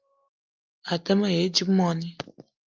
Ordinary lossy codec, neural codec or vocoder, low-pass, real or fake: Opus, 32 kbps; none; 7.2 kHz; real